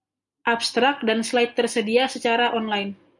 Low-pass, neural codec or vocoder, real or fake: 10.8 kHz; none; real